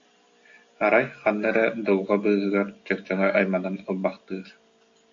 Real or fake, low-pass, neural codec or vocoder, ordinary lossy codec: real; 7.2 kHz; none; AAC, 48 kbps